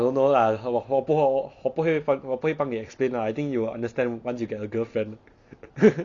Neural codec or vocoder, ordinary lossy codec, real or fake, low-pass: none; Opus, 64 kbps; real; 7.2 kHz